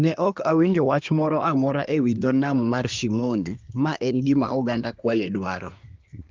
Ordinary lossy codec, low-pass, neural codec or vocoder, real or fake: Opus, 32 kbps; 7.2 kHz; codec, 24 kHz, 1 kbps, SNAC; fake